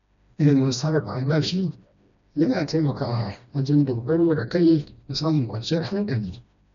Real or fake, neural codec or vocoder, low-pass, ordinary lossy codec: fake; codec, 16 kHz, 1 kbps, FreqCodec, smaller model; 7.2 kHz; none